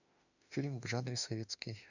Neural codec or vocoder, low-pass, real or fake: autoencoder, 48 kHz, 32 numbers a frame, DAC-VAE, trained on Japanese speech; 7.2 kHz; fake